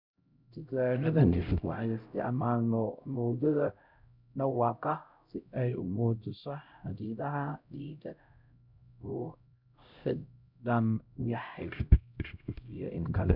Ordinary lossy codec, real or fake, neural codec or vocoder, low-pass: none; fake; codec, 16 kHz, 0.5 kbps, X-Codec, HuBERT features, trained on LibriSpeech; 5.4 kHz